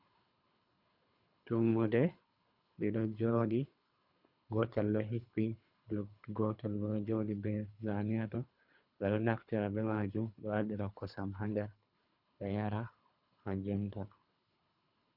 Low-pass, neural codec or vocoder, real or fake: 5.4 kHz; codec, 24 kHz, 3 kbps, HILCodec; fake